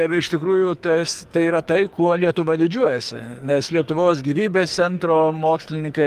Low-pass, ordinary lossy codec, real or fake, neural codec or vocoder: 14.4 kHz; Opus, 32 kbps; fake; codec, 44.1 kHz, 2.6 kbps, SNAC